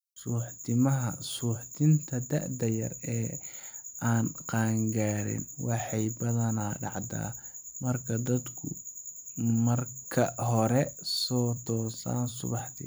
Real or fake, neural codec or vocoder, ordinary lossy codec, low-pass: fake; vocoder, 44.1 kHz, 128 mel bands every 256 samples, BigVGAN v2; none; none